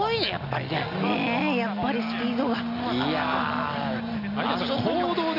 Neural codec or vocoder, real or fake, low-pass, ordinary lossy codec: none; real; 5.4 kHz; none